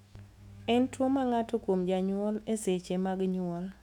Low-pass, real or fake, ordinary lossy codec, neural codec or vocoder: 19.8 kHz; fake; none; autoencoder, 48 kHz, 128 numbers a frame, DAC-VAE, trained on Japanese speech